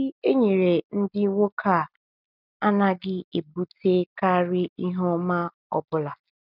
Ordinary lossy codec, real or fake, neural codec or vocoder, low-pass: none; real; none; 5.4 kHz